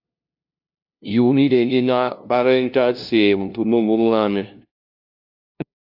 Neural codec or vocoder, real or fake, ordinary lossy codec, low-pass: codec, 16 kHz, 0.5 kbps, FunCodec, trained on LibriTTS, 25 frames a second; fake; AAC, 48 kbps; 5.4 kHz